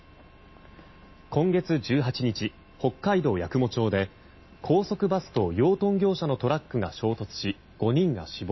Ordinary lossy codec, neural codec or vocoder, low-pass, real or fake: MP3, 24 kbps; none; 7.2 kHz; real